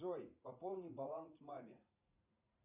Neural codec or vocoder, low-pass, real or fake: vocoder, 44.1 kHz, 80 mel bands, Vocos; 3.6 kHz; fake